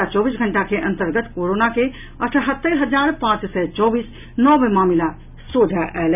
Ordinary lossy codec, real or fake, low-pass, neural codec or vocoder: none; real; 3.6 kHz; none